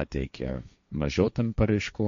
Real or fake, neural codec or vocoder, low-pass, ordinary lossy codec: fake; codec, 16 kHz, 1.1 kbps, Voila-Tokenizer; 7.2 kHz; MP3, 48 kbps